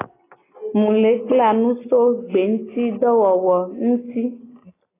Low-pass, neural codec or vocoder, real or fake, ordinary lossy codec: 3.6 kHz; none; real; AAC, 16 kbps